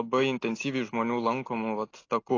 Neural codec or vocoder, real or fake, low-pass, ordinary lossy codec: none; real; 7.2 kHz; AAC, 32 kbps